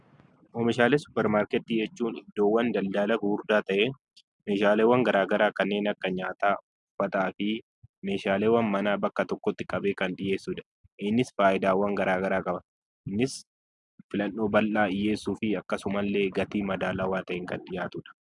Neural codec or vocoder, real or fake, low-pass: none; real; 10.8 kHz